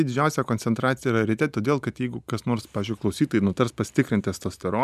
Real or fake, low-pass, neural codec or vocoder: real; 14.4 kHz; none